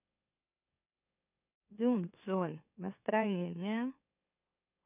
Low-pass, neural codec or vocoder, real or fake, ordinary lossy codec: 3.6 kHz; autoencoder, 44.1 kHz, a latent of 192 numbers a frame, MeloTTS; fake; none